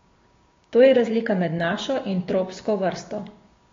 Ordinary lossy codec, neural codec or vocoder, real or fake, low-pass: AAC, 32 kbps; codec, 16 kHz, 6 kbps, DAC; fake; 7.2 kHz